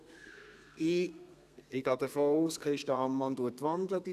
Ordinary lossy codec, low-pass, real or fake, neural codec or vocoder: none; 14.4 kHz; fake; codec, 32 kHz, 1.9 kbps, SNAC